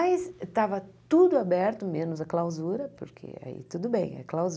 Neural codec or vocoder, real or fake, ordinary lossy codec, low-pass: none; real; none; none